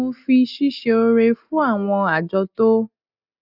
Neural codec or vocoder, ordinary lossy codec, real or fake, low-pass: none; none; real; 5.4 kHz